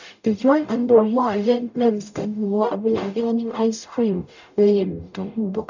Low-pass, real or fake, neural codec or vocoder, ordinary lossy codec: 7.2 kHz; fake; codec, 44.1 kHz, 0.9 kbps, DAC; none